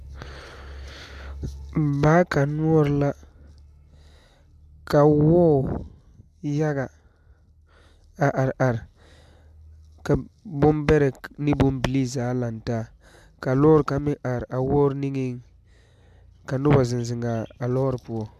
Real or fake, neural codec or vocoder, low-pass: real; none; 14.4 kHz